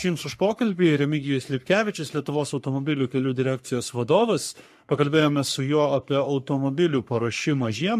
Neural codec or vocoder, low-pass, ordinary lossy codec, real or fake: codec, 44.1 kHz, 3.4 kbps, Pupu-Codec; 14.4 kHz; MP3, 64 kbps; fake